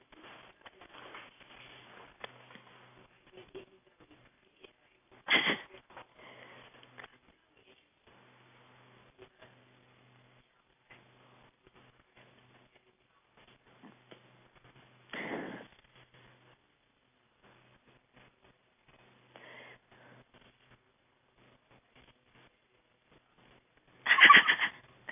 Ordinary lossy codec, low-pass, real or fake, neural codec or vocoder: AAC, 24 kbps; 3.6 kHz; real; none